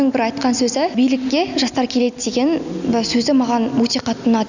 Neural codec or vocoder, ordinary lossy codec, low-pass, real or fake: none; none; 7.2 kHz; real